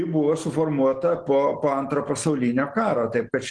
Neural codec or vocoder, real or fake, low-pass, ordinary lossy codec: none; real; 10.8 kHz; Opus, 16 kbps